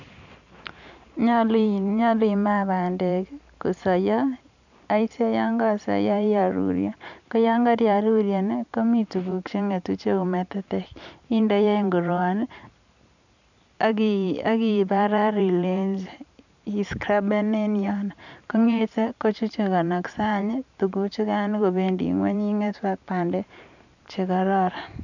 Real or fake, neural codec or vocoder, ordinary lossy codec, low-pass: fake; vocoder, 44.1 kHz, 128 mel bands every 512 samples, BigVGAN v2; none; 7.2 kHz